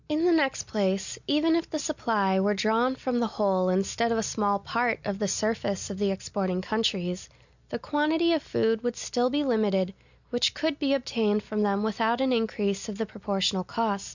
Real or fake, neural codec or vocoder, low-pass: real; none; 7.2 kHz